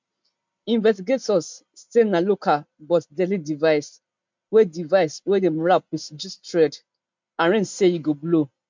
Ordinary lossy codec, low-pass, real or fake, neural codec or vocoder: MP3, 64 kbps; 7.2 kHz; real; none